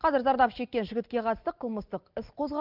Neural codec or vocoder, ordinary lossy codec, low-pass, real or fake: none; Opus, 32 kbps; 5.4 kHz; real